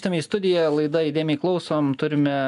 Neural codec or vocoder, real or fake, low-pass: none; real; 10.8 kHz